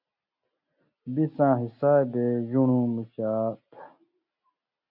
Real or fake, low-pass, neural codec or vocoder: real; 5.4 kHz; none